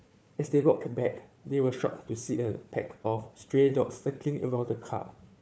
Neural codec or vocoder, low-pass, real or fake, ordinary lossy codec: codec, 16 kHz, 4 kbps, FunCodec, trained on Chinese and English, 50 frames a second; none; fake; none